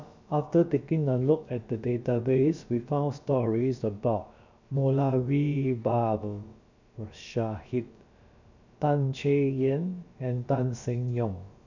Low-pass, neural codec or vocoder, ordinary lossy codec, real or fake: 7.2 kHz; codec, 16 kHz, about 1 kbps, DyCAST, with the encoder's durations; none; fake